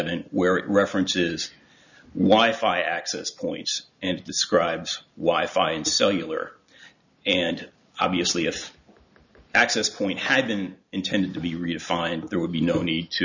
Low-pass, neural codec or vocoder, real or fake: 7.2 kHz; none; real